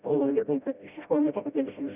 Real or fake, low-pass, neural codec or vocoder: fake; 3.6 kHz; codec, 16 kHz, 0.5 kbps, FreqCodec, smaller model